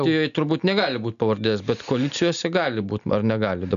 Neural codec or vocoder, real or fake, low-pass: none; real; 7.2 kHz